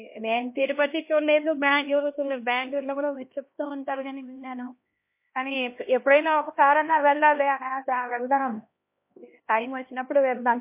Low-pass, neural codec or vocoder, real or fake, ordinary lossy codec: 3.6 kHz; codec, 16 kHz, 1 kbps, X-Codec, HuBERT features, trained on LibriSpeech; fake; MP3, 24 kbps